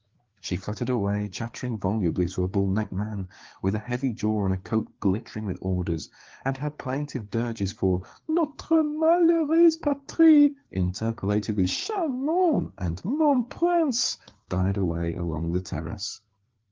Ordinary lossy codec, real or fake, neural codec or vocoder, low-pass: Opus, 16 kbps; fake; codec, 16 kHz, 4 kbps, FreqCodec, larger model; 7.2 kHz